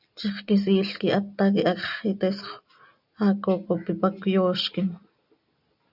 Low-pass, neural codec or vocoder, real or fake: 5.4 kHz; none; real